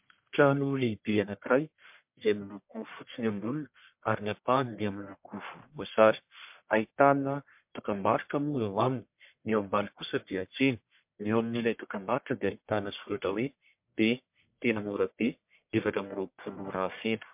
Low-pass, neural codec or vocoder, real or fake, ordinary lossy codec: 3.6 kHz; codec, 44.1 kHz, 1.7 kbps, Pupu-Codec; fake; MP3, 32 kbps